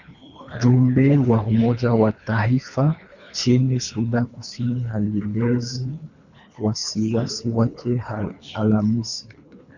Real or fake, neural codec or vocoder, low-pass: fake; codec, 24 kHz, 3 kbps, HILCodec; 7.2 kHz